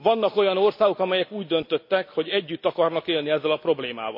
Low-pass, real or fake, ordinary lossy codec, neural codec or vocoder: 5.4 kHz; real; MP3, 32 kbps; none